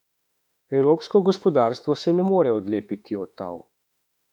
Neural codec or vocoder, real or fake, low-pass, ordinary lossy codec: autoencoder, 48 kHz, 32 numbers a frame, DAC-VAE, trained on Japanese speech; fake; 19.8 kHz; none